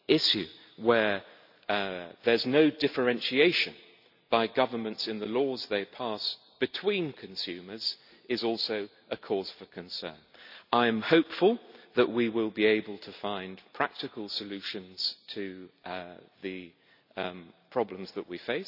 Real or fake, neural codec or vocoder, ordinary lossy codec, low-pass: real; none; none; 5.4 kHz